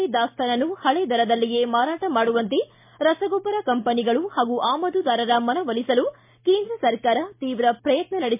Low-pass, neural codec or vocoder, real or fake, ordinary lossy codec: 3.6 kHz; none; real; MP3, 32 kbps